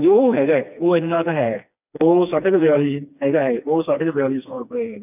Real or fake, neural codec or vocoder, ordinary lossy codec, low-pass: fake; codec, 16 kHz, 2 kbps, FreqCodec, smaller model; none; 3.6 kHz